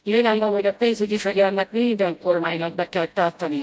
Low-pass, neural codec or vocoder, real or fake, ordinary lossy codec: none; codec, 16 kHz, 0.5 kbps, FreqCodec, smaller model; fake; none